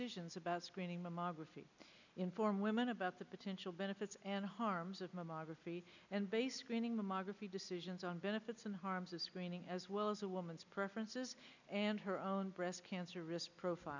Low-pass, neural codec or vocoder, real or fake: 7.2 kHz; none; real